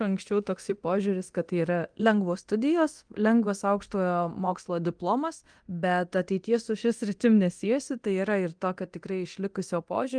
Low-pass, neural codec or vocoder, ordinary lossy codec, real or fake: 9.9 kHz; codec, 24 kHz, 0.9 kbps, DualCodec; Opus, 24 kbps; fake